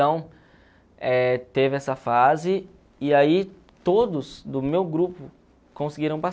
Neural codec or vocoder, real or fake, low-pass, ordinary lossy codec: none; real; none; none